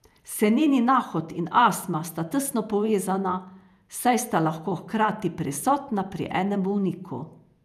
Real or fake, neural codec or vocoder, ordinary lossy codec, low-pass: fake; vocoder, 48 kHz, 128 mel bands, Vocos; none; 14.4 kHz